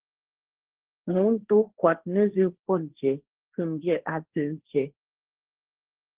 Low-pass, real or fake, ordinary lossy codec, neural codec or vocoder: 3.6 kHz; fake; Opus, 16 kbps; codec, 16 kHz, 1.1 kbps, Voila-Tokenizer